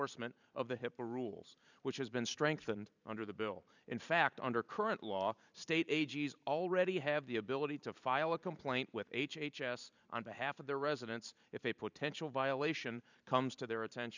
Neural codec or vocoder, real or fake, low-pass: vocoder, 44.1 kHz, 128 mel bands every 512 samples, BigVGAN v2; fake; 7.2 kHz